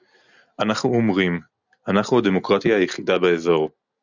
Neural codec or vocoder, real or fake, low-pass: none; real; 7.2 kHz